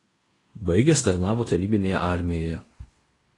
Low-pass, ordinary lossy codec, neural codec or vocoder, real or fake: 10.8 kHz; AAC, 32 kbps; codec, 16 kHz in and 24 kHz out, 0.9 kbps, LongCat-Audio-Codec, fine tuned four codebook decoder; fake